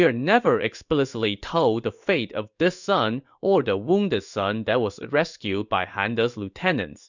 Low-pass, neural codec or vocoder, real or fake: 7.2 kHz; codec, 16 kHz in and 24 kHz out, 1 kbps, XY-Tokenizer; fake